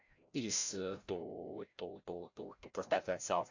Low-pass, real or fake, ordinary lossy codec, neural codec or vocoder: 7.2 kHz; fake; AAC, 48 kbps; codec, 16 kHz, 0.5 kbps, FreqCodec, larger model